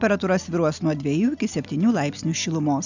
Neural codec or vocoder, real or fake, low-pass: none; real; 7.2 kHz